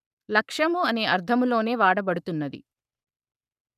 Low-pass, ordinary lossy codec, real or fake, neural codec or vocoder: 14.4 kHz; none; fake; codec, 44.1 kHz, 7.8 kbps, Pupu-Codec